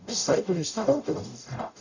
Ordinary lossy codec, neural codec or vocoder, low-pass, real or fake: none; codec, 44.1 kHz, 0.9 kbps, DAC; 7.2 kHz; fake